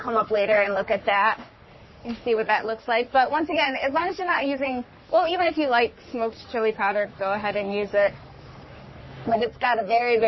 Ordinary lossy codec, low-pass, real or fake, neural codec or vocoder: MP3, 24 kbps; 7.2 kHz; fake; codec, 44.1 kHz, 3.4 kbps, Pupu-Codec